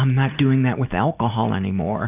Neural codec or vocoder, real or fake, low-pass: none; real; 3.6 kHz